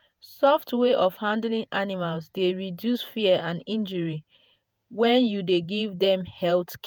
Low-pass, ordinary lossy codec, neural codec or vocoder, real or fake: none; none; vocoder, 48 kHz, 128 mel bands, Vocos; fake